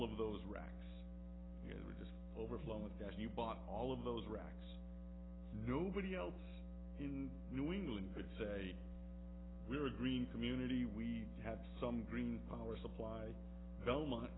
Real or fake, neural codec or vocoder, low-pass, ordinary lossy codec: real; none; 7.2 kHz; AAC, 16 kbps